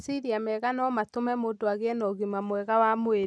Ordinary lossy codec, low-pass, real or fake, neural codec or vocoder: none; none; real; none